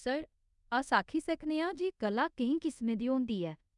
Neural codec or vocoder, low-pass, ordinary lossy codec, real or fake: codec, 24 kHz, 0.5 kbps, DualCodec; 10.8 kHz; none; fake